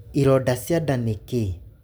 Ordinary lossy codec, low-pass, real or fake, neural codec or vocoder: none; none; real; none